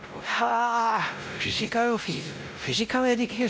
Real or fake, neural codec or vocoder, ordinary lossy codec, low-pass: fake; codec, 16 kHz, 0.5 kbps, X-Codec, WavLM features, trained on Multilingual LibriSpeech; none; none